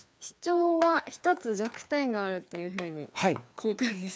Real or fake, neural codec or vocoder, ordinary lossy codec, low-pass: fake; codec, 16 kHz, 2 kbps, FreqCodec, larger model; none; none